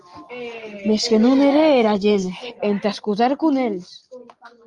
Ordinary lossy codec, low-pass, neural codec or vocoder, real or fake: Opus, 32 kbps; 10.8 kHz; none; real